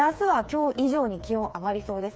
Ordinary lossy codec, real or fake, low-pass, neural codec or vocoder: none; fake; none; codec, 16 kHz, 4 kbps, FreqCodec, smaller model